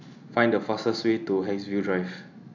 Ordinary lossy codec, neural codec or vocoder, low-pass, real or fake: none; none; 7.2 kHz; real